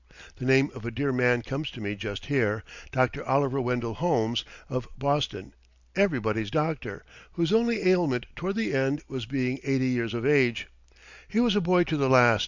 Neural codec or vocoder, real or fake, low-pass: none; real; 7.2 kHz